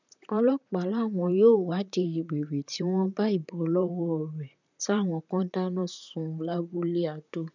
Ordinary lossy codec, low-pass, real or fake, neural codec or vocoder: none; 7.2 kHz; fake; vocoder, 44.1 kHz, 128 mel bands, Pupu-Vocoder